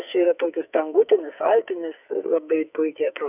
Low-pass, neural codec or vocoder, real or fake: 3.6 kHz; codec, 44.1 kHz, 2.6 kbps, SNAC; fake